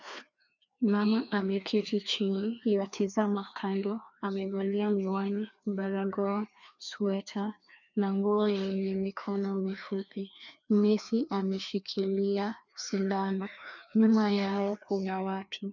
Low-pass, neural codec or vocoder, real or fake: 7.2 kHz; codec, 16 kHz, 2 kbps, FreqCodec, larger model; fake